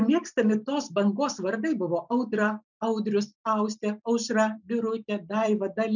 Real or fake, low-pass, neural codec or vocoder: real; 7.2 kHz; none